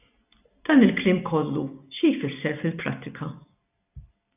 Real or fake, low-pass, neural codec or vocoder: real; 3.6 kHz; none